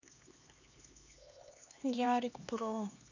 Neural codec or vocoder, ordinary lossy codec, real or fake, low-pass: codec, 16 kHz, 2 kbps, X-Codec, HuBERT features, trained on LibriSpeech; none; fake; 7.2 kHz